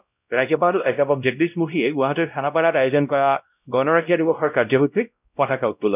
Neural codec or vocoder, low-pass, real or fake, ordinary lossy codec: codec, 16 kHz, 0.5 kbps, X-Codec, WavLM features, trained on Multilingual LibriSpeech; 3.6 kHz; fake; none